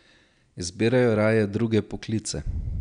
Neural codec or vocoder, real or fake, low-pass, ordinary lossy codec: none; real; 9.9 kHz; none